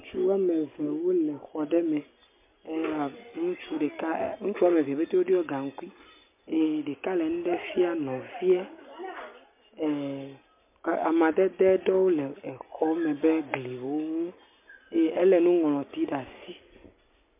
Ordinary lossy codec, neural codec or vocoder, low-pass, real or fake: MP3, 32 kbps; none; 3.6 kHz; real